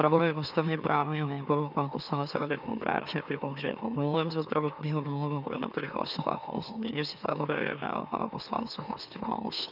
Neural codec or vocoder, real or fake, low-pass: autoencoder, 44.1 kHz, a latent of 192 numbers a frame, MeloTTS; fake; 5.4 kHz